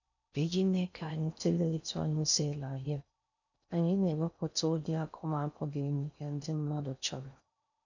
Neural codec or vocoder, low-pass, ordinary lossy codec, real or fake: codec, 16 kHz in and 24 kHz out, 0.6 kbps, FocalCodec, streaming, 4096 codes; 7.2 kHz; none; fake